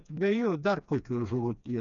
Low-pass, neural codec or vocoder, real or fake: 7.2 kHz; codec, 16 kHz, 2 kbps, FreqCodec, smaller model; fake